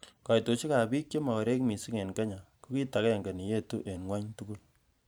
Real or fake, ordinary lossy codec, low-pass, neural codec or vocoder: fake; none; none; vocoder, 44.1 kHz, 128 mel bands every 512 samples, BigVGAN v2